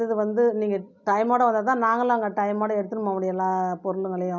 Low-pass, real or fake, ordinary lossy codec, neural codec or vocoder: 7.2 kHz; real; none; none